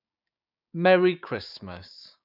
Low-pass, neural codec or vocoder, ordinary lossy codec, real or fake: 5.4 kHz; codec, 16 kHz, 6 kbps, DAC; none; fake